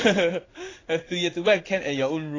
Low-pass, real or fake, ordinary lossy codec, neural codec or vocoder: 7.2 kHz; fake; AAC, 32 kbps; codec, 16 kHz in and 24 kHz out, 1 kbps, XY-Tokenizer